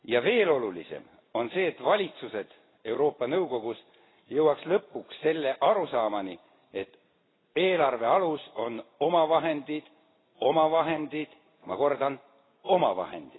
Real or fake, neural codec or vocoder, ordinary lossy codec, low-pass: real; none; AAC, 16 kbps; 7.2 kHz